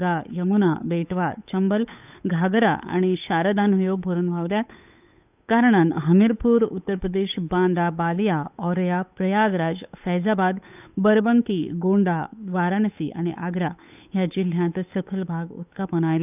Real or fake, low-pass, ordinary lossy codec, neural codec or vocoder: fake; 3.6 kHz; none; codec, 16 kHz, 8 kbps, FunCodec, trained on Chinese and English, 25 frames a second